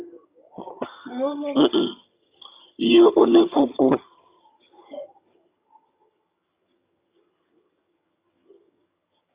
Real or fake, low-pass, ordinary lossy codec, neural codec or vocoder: fake; 3.6 kHz; Opus, 64 kbps; vocoder, 22.05 kHz, 80 mel bands, HiFi-GAN